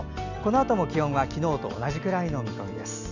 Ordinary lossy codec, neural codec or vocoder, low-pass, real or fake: none; none; 7.2 kHz; real